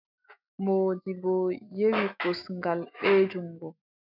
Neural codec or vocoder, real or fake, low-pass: autoencoder, 48 kHz, 128 numbers a frame, DAC-VAE, trained on Japanese speech; fake; 5.4 kHz